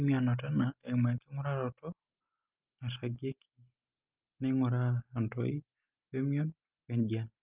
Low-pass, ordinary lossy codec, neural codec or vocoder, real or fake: 3.6 kHz; Opus, 24 kbps; none; real